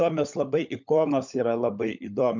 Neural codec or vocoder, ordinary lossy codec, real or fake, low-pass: codec, 16 kHz, 16 kbps, FunCodec, trained on LibriTTS, 50 frames a second; MP3, 64 kbps; fake; 7.2 kHz